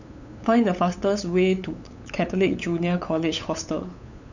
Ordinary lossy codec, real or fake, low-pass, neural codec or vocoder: none; fake; 7.2 kHz; codec, 16 kHz, 8 kbps, FunCodec, trained on LibriTTS, 25 frames a second